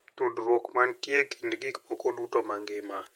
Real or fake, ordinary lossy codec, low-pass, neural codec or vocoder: fake; MP3, 64 kbps; 19.8 kHz; vocoder, 48 kHz, 128 mel bands, Vocos